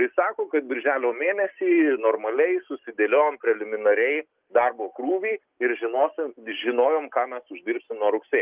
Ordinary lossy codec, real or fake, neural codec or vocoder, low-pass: Opus, 32 kbps; real; none; 3.6 kHz